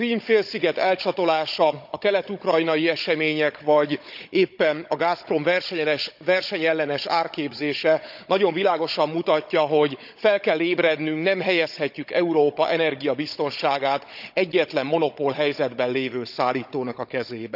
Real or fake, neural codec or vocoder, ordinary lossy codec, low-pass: fake; codec, 16 kHz, 16 kbps, FunCodec, trained on Chinese and English, 50 frames a second; none; 5.4 kHz